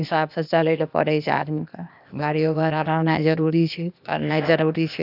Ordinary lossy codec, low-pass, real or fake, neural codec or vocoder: none; 5.4 kHz; fake; codec, 16 kHz, 0.8 kbps, ZipCodec